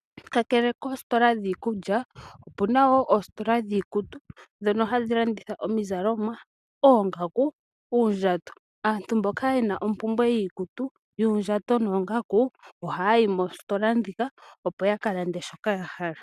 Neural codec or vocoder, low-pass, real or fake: none; 14.4 kHz; real